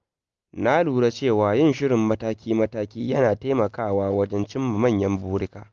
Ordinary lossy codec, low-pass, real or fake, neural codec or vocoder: Opus, 32 kbps; 7.2 kHz; real; none